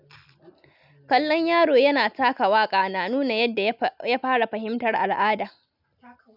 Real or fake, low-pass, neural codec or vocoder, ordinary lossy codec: real; 5.4 kHz; none; none